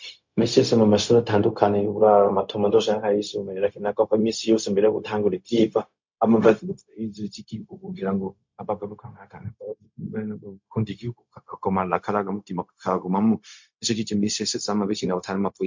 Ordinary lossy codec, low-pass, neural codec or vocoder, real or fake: MP3, 48 kbps; 7.2 kHz; codec, 16 kHz, 0.4 kbps, LongCat-Audio-Codec; fake